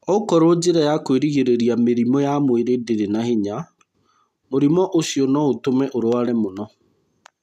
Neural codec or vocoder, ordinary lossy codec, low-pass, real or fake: none; none; 14.4 kHz; real